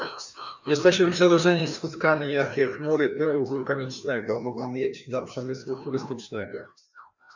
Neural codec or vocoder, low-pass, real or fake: codec, 16 kHz, 1 kbps, FreqCodec, larger model; 7.2 kHz; fake